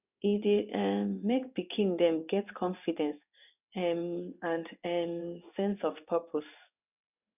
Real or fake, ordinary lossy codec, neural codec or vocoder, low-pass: fake; none; codec, 16 kHz in and 24 kHz out, 1 kbps, XY-Tokenizer; 3.6 kHz